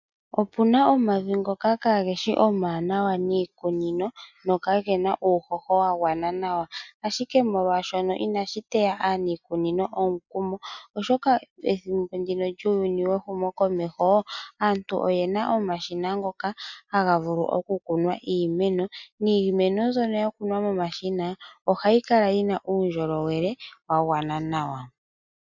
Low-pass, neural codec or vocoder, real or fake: 7.2 kHz; none; real